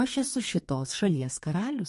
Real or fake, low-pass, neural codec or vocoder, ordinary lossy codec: fake; 14.4 kHz; codec, 32 kHz, 1.9 kbps, SNAC; MP3, 48 kbps